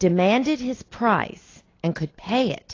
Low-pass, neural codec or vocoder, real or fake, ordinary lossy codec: 7.2 kHz; none; real; AAC, 32 kbps